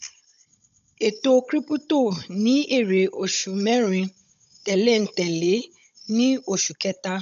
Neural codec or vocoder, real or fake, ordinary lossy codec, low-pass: codec, 16 kHz, 16 kbps, FunCodec, trained on Chinese and English, 50 frames a second; fake; none; 7.2 kHz